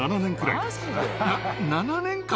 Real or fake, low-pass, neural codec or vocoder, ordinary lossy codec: real; none; none; none